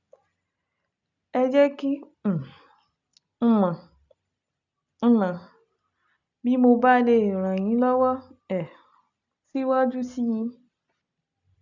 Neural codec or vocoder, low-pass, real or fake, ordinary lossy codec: none; 7.2 kHz; real; none